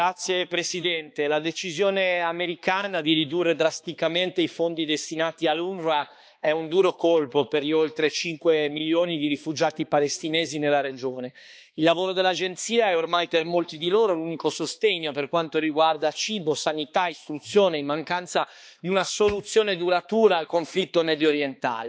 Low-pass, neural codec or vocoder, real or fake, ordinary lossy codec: none; codec, 16 kHz, 2 kbps, X-Codec, HuBERT features, trained on balanced general audio; fake; none